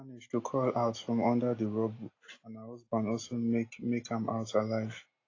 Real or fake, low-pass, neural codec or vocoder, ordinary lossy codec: real; 7.2 kHz; none; AAC, 32 kbps